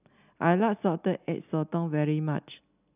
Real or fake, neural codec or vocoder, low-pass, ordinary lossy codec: real; none; 3.6 kHz; none